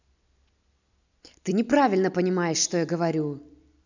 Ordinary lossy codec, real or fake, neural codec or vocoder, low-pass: none; real; none; 7.2 kHz